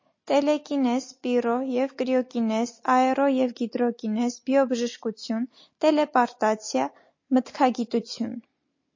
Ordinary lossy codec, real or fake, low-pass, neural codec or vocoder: MP3, 32 kbps; real; 7.2 kHz; none